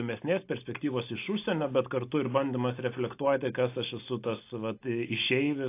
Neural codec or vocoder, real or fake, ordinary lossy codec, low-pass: none; real; AAC, 24 kbps; 3.6 kHz